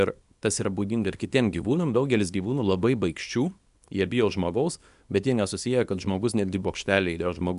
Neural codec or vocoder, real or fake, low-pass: codec, 24 kHz, 0.9 kbps, WavTokenizer, small release; fake; 10.8 kHz